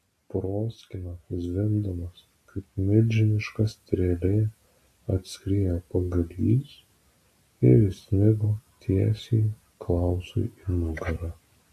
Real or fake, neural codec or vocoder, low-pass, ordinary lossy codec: real; none; 14.4 kHz; AAC, 64 kbps